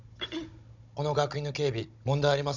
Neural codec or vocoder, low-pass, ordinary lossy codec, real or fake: codec, 16 kHz, 16 kbps, FunCodec, trained on Chinese and English, 50 frames a second; 7.2 kHz; none; fake